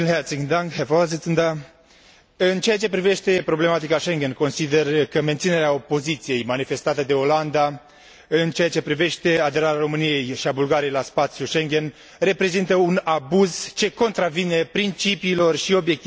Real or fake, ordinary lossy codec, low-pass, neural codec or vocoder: real; none; none; none